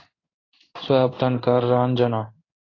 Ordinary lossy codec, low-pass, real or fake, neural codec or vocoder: AAC, 32 kbps; 7.2 kHz; fake; codec, 16 kHz in and 24 kHz out, 1 kbps, XY-Tokenizer